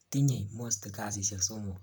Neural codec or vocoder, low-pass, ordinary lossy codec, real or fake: vocoder, 44.1 kHz, 128 mel bands, Pupu-Vocoder; none; none; fake